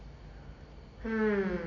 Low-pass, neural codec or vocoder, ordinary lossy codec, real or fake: 7.2 kHz; none; none; real